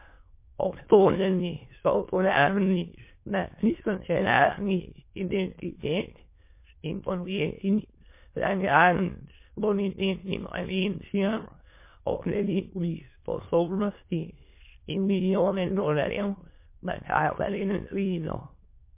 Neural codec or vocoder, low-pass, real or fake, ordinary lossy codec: autoencoder, 22.05 kHz, a latent of 192 numbers a frame, VITS, trained on many speakers; 3.6 kHz; fake; MP3, 24 kbps